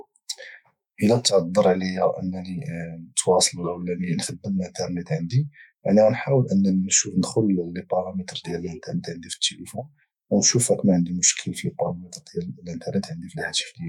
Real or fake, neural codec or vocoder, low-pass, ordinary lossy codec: fake; autoencoder, 48 kHz, 128 numbers a frame, DAC-VAE, trained on Japanese speech; 19.8 kHz; none